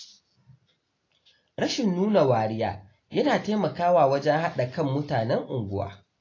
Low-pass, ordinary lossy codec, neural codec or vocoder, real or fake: 7.2 kHz; AAC, 32 kbps; none; real